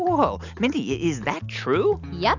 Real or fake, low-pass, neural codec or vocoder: real; 7.2 kHz; none